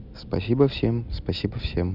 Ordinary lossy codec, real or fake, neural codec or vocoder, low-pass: none; real; none; 5.4 kHz